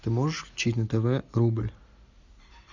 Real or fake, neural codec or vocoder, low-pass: real; none; 7.2 kHz